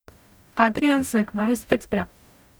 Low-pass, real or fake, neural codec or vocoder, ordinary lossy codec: none; fake; codec, 44.1 kHz, 0.9 kbps, DAC; none